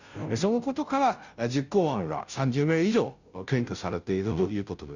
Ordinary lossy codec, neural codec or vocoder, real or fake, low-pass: none; codec, 16 kHz, 0.5 kbps, FunCodec, trained on Chinese and English, 25 frames a second; fake; 7.2 kHz